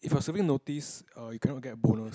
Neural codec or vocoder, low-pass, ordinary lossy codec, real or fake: none; none; none; real